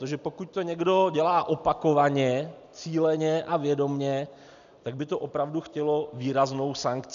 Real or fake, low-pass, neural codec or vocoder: real; 7.2 kHz; none